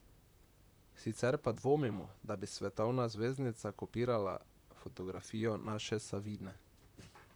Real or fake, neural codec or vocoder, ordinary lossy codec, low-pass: fake; vocoder, 44.1 kHz, 128 mel bands, Pupu-Vocoder; none; none